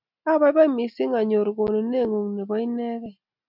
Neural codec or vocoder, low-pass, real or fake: none; 5.4 kHz; real